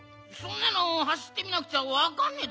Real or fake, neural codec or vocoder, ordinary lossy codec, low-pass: real; none; none; none